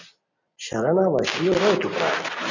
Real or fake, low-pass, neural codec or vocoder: real; 7.2 kHz; none